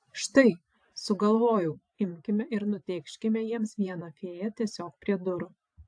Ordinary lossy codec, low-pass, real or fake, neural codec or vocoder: AAC, 64 kbps; 9.9 kHz; fake; vocoder, 44.1 kHz, 128 mel bands every 512 samples, BigVGAN v2